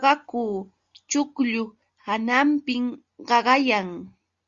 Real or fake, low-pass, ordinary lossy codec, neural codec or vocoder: real; 7.2 kHz; Opus, 64 kbps; none